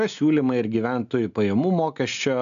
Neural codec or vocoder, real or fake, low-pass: none; real; 7.2 kHz